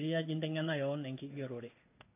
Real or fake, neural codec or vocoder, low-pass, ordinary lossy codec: fake; codec, 16 kHz in and 24 kHz out, 1 kbps, XY-Tokenizer; 3.6 kHz; AAC, 24 kbps